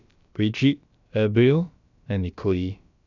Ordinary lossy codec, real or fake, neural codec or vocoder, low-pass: none; fake; codec, 16 kHz, about 1 kbps, DyCAST, with the encoder's durations; 7.2 kHz